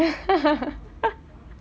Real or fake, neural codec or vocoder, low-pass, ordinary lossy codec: real; none; none; none